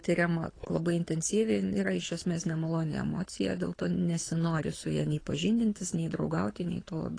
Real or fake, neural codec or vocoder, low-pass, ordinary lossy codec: fake; codec, 24 kHz, 6 kbps, HILCodec; 9.9 kHz; AAC, 32 kbps